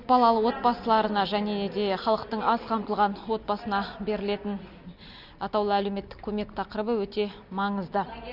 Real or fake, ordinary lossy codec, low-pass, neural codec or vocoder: real; none; 5.4 kHz; none